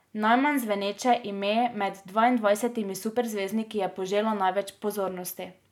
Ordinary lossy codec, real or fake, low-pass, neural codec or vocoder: none; real; 19.8 kHz; none